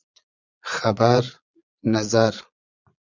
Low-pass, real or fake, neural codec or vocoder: 7.2 kHz; fake; vocoder, 22.05 kHz, 80 mel bands, Vocos